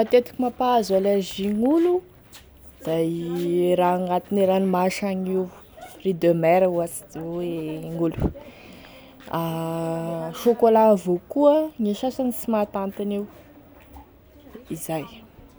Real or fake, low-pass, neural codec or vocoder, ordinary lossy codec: real; none; none; none